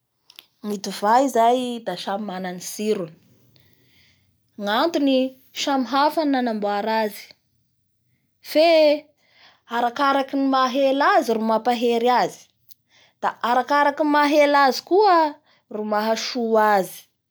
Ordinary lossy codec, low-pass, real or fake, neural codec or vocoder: none; none; real; none